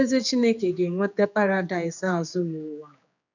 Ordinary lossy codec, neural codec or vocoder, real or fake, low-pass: none; codec, 16 kHz, 4 kbps, X-Codec, HuBERT features, trained on general audio; fake; 7.2 kHz